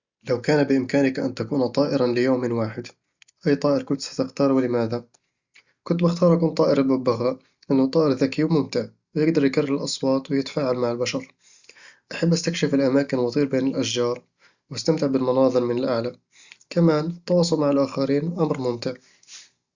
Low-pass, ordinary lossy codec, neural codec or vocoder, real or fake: 7.2 kHz; Opus, 64 kbps; none; real